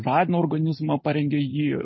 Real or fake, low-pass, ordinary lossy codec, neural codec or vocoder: real; 7.2 kHz; MP3, 24 kbps; none